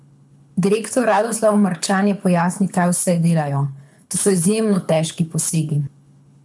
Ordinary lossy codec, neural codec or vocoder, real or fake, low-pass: none; codec, 24 kHz, 6 kbps, HILCodec; fake; none